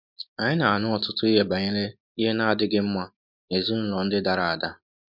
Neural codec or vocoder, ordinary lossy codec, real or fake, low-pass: none; MP3, 48 kbps; real; 5.4 kHz